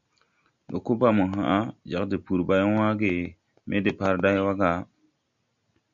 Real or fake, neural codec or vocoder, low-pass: real; none; 7.2 kHz